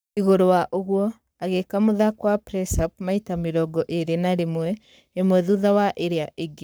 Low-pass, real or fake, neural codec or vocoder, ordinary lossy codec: none; fake; codec, 44.1 kHz, 7.8 kbps, Pupu-Codec; none